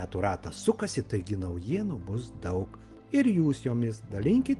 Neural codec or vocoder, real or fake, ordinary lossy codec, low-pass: none; real; Opus, 24 kbps; 10.8 kHz